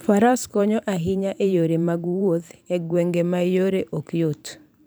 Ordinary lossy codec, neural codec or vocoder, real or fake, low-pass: none; vocoder, 44.1 kHz, 128 mel bands every 512 samples, BigVGAN v2; fake; none